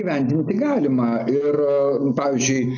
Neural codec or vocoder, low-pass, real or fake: none; 7.2 kHz; real